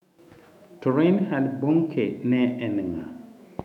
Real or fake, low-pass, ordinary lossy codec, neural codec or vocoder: fake; 19.8 kHz; none; autoencoder, 48 kHz, 128 numbers a frame, DAC-VAE, trained on Japanese speech